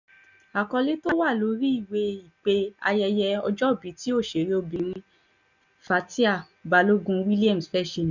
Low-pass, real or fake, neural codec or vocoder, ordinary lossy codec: 7.2 kHz; real; none; none